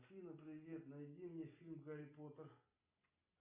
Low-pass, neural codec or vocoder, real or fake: 3.6 kHz; none; real